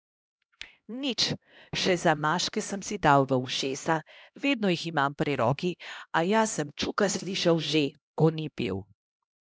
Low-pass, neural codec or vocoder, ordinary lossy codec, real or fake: none; codec, 16 kHz, 1 kbps, X-Codec, HuBERT features, trained on LibriSpeech; none; fake